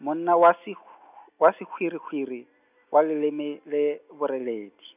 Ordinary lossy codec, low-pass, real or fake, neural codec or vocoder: none; 3.6 kHz; real; none